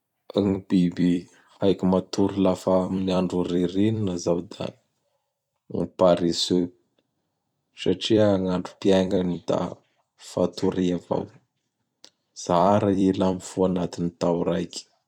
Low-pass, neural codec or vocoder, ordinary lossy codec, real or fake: 19.8 kHz; vocoder, 44.1 kHz, 128 mel bands every 256 samples, BigVGAN v2; none; fake